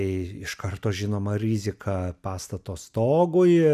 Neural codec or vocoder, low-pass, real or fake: none; 14.4 kHz; real